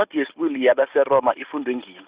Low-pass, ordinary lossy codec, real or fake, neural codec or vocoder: 3.6 kHz; Opus, 64 kbps; real; none